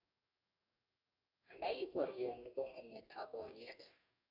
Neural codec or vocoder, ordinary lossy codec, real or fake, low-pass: codec, 44.1 kHz, 2.6 kbps, DAC; none; fake; 5.4 kHz